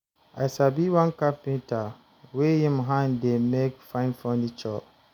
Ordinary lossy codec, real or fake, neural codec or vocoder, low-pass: none; real; none; 19.8 kHz